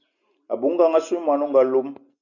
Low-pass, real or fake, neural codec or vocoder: 7.2 kHz; real; none